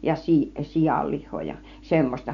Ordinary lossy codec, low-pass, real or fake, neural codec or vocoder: none; 7.2 kHz; real; none